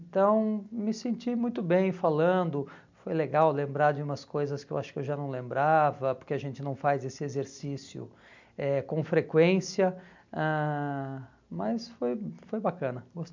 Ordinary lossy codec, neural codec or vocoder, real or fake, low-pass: MP3, 64 kbps; none; real; 7.2 kHz